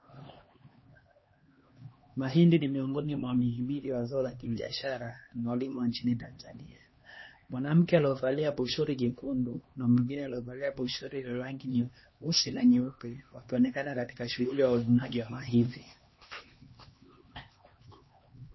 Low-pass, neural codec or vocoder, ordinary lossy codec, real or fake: 7.2 kHz; codec, 16 kHz, 2 kbps, X-Codec, HuBERT features, trained on LibriSpeech; MP3, 24 kbps; fake